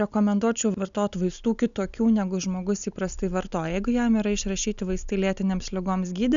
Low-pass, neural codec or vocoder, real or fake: 7.2 kHz; none; real